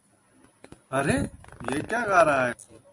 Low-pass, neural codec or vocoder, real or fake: 10.8 kHz; none; real